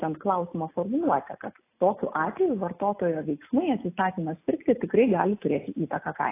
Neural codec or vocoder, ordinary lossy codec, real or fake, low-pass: none; AAC, 24 kbps; real; 3.6 kHz